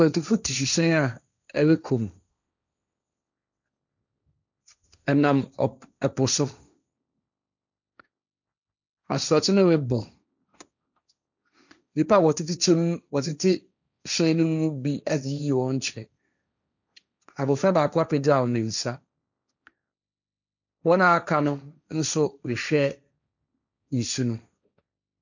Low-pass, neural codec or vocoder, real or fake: 7.2 kHz; codec, 16 kHz, 1.1 kbps, Voila-Tokenizer; fake